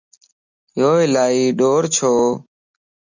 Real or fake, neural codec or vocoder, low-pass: real; none; 7.2 kHz